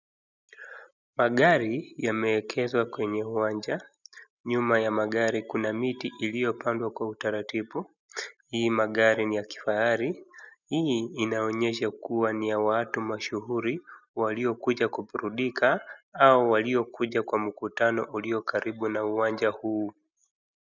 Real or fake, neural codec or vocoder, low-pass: real; none; 7.2 kHz